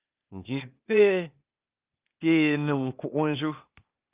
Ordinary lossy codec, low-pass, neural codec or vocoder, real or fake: Opus, 32 kbps; 3.6 kHz; codec, 16 kHz, 0.8 kbps, ZipCodec; fake